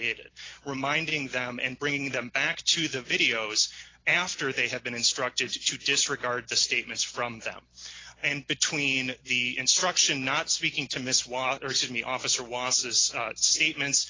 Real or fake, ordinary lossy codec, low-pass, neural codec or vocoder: real; AAC, 32 kbps; 7.2 kHz; none